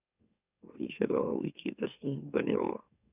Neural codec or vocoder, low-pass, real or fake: autoencoder, 44.1 kHz, a latent of 192 numbers a frame, MeloTTS; 3.6 kHz; fake